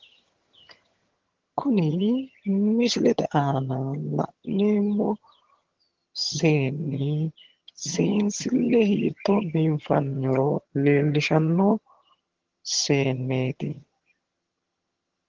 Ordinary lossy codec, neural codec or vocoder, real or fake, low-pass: Opus, 16 kbps; vocoder, 22.05 kHz, 80 mel bands, HiFi-GAN; fake; 7.2 kHz